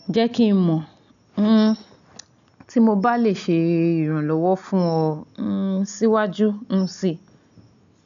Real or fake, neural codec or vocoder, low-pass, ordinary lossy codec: real; none; 7.2 kHz; MP3, 96 kbps